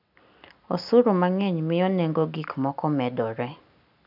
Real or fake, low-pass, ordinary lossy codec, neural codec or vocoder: real; 5.4 kHz; none; none